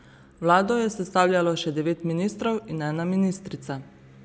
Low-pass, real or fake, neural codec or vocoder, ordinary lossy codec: none; real; none; none